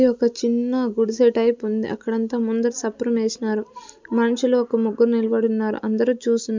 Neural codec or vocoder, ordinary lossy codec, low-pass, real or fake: none; MP3, 64 kbps; 7.2 kHz; real